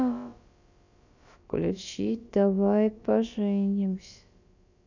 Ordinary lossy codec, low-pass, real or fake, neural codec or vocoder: none; 7.2 kHz; fake; codec, 16 kHz, about 1 kbps, DyCAST, with the encoder's durations